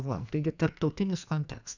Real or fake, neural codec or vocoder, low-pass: fake; codec, 44.1 kHz, 2.6 kbps, SNAC; 7.2 kHz